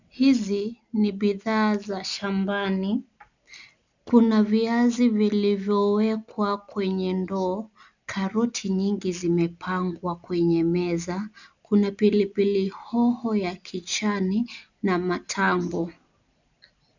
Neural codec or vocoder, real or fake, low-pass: none; real; 7.2 kHz